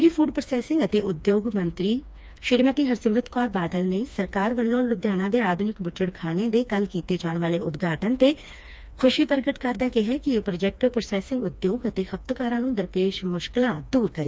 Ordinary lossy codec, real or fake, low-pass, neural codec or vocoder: none; fake; none; codec, 16 kHz, 2 kbps, FreqCodec, smaller model